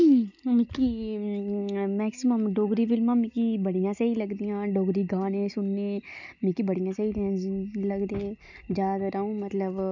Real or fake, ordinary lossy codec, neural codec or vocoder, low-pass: real; none; none; 7.2 kHz